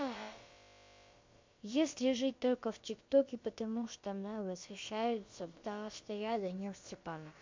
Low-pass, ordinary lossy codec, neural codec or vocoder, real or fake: 7.2 kHz; MP3, 48 kbps; codec, 16 kHz, about 1 kbps, DyCAST, with the encoder's durations; fake